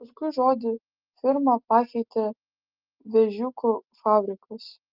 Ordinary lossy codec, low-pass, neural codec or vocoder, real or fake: Opus, 32 kbps; 5.4 kHz; none; real